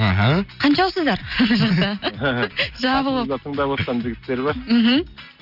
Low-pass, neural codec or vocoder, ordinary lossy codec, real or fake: 5.4 kHz; none; none; real